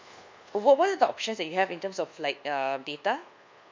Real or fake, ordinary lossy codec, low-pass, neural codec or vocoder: fake; MP3, 64 kbps; 7.2 kHz; codec, 24 kHz, 1.2 kbps, DualCodec